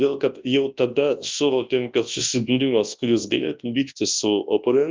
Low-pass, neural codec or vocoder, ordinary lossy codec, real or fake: 7.2 kHz; codec, 24 kHz, 0.9 kbps, WavTokenizer, large speech release; Opus, 24 kbps; fake